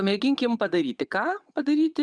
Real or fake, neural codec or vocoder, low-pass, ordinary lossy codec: fake; vocoder, 22.05 kHz, 80 mel bands, WaveNeXt; 9.9 kHz; Opus, 64 kbps